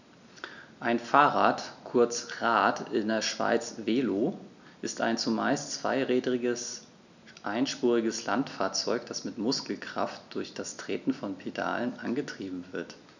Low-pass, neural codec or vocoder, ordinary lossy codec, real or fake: 7.2 kHz; none; none; real